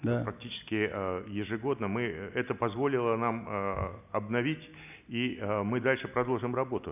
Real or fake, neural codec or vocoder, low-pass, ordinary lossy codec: real; none; 3.6 kHz; none